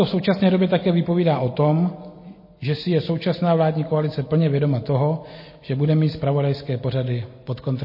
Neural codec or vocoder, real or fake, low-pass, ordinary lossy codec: none; real; 5.4 kHz; MP3, 24 kbps